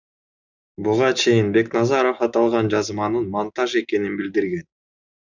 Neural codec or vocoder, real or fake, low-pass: none; real; 7.2 kHz